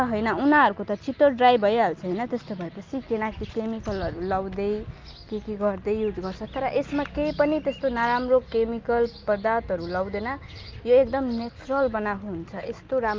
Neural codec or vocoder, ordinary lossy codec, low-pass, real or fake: none; Opus, 24 kbps; 7.2 kHz; real